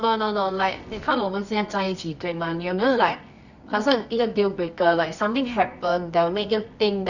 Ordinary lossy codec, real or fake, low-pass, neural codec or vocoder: none; fake; 7.2 kHz; codec, 24 kHz, 0.9 kbps, WavTokenizer, medium music audio release